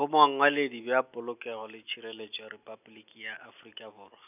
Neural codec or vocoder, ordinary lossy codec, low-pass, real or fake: none; none; 3.6 kHz; real